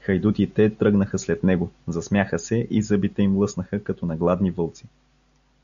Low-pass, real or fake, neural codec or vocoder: 7.2 kHz; real; none